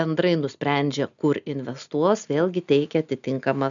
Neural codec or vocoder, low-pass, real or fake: none; 7.2 kHz; real